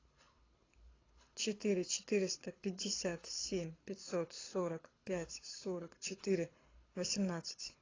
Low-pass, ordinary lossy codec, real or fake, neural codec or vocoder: 7.2 kHz; AAC, 32 kbps; fake; codec, 24 kHz, 6 kbps, HILCodec